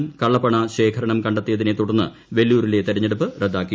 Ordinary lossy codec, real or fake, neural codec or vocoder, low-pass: none; real; none; none